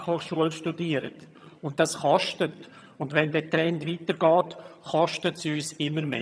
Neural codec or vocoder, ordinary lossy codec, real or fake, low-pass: vocoder, 22.05 kHz, 80 mel bands, HiFi-GAN; none; fake; none